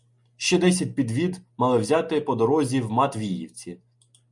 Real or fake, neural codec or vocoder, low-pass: real; none; 10.8 kHz